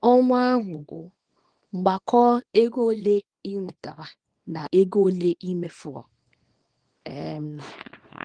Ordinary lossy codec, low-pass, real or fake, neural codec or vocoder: Opus, 24 kbps; 9.9 kHz; fake; codec, 24 kHz, 0.9 kbps, WavTokenizer, small release